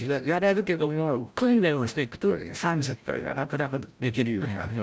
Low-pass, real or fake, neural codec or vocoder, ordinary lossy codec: none; fake; codec, 16 kHz, 0.5 kbps, FreqCodec, larger model; none